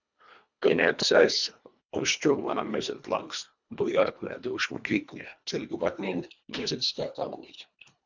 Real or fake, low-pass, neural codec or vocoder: fake; 7.2 kHz; codec, 24 kHz, 1.5 kbps, HILCodec